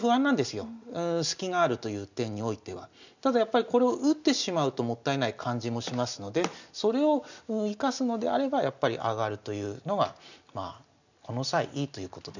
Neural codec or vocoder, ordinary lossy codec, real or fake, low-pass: none; none; real; 7.2 kHz